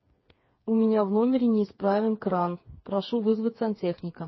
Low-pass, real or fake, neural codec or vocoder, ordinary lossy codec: 7.2 kHz; fake; codec, 16 kHz, 4 kbps, FreqCodec, smaller model; MP3, 24 kbps